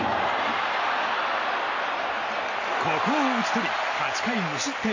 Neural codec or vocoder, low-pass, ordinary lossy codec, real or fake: none; 7.2 kHz; AAC, 48 kbps; real